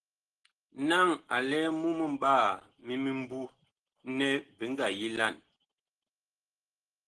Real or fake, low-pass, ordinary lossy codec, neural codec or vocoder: real; 10.8 kHz; Opus, 16 kbps; none